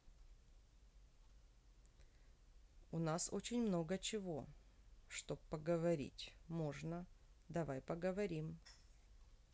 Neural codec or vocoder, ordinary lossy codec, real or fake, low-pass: none; none; real; none